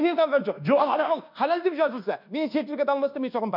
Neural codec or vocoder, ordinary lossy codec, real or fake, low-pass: codec, 24 kHz, 1.2 kbps, DualCodec; none; fake; 5.4 kHz